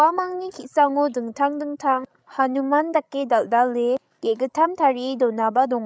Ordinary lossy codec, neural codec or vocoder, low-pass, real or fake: none; codec, 16 kHz, 16 kbps, FreqCodec, larger model; none; fake